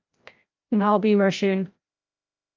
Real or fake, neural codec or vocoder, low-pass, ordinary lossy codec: fake; codec, 16 kHz, 0.5 kbps, FreqCodec, larger model; 7.2 kHz; Opus, 24 kbps